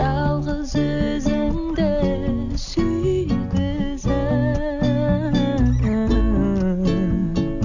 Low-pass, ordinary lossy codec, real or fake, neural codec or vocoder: 7.2 kHz; none; real; none